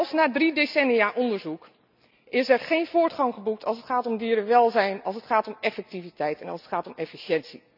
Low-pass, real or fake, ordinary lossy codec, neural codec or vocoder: 5.4 kHz; real; none; none